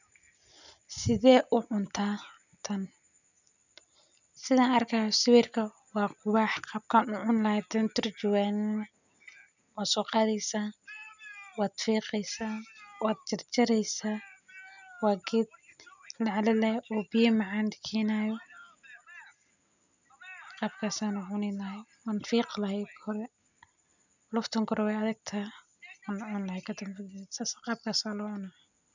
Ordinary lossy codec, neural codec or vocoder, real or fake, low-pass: none; none; real; 7.2 kHz